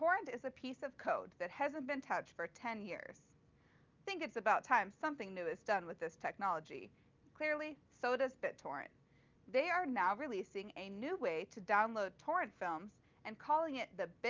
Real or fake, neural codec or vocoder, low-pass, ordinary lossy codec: real; none; 7.2 kHz; Opus, 24 kbps